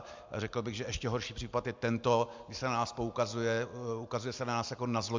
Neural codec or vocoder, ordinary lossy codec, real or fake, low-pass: none; MP3, 64 kbps; real; 7.2 kHz